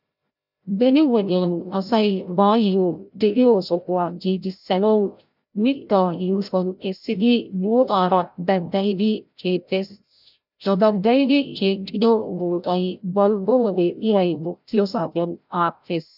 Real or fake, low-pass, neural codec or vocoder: fake; 5.4 kHz; codec, 16 kHz, 0.5 kbps, FreqCodec, larger model